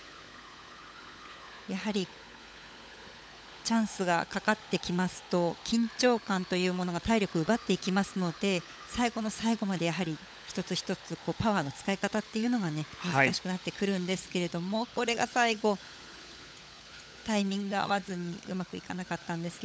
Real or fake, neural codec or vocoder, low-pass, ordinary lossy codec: fake; codec, 16 kHz, 16 kbps, FunCodec, trained on LibriTTS, 50 frames a second; none; none